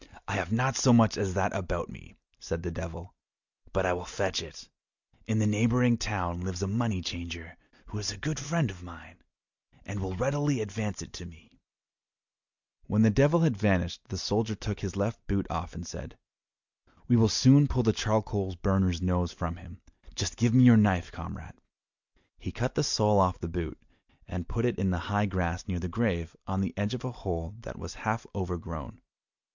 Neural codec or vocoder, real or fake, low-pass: none; real; 7.2 kHz